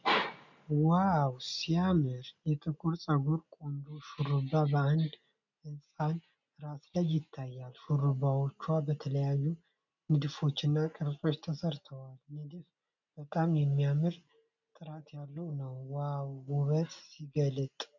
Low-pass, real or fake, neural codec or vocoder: 7.2 kHz; real; none